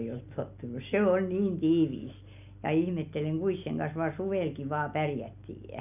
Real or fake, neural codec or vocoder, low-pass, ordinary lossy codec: real; none; 3.6 kHz; none